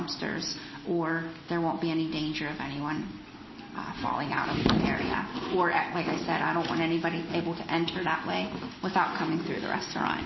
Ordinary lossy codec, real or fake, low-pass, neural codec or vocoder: MP3, 24 kbps; real; 7.2 kHz; none